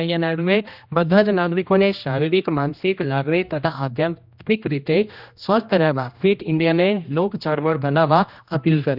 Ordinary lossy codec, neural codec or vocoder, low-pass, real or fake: none; codec, 16 kHz, 1 kbps, X-Codec, HuBERT features, trained on general audio; 5.4 kHz; fake